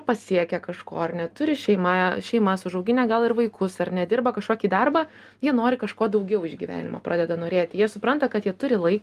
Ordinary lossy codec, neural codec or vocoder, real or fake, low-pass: Opus, 32 kbps; none; real; 14.4 kHz